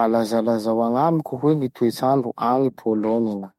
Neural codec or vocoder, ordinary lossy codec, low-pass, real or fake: autoencoder, 48 kHz, 32 numbers a frame, DAC-VAE, trained on Japanese speech; MP3, 64 kbps; 19.8 kHz; fake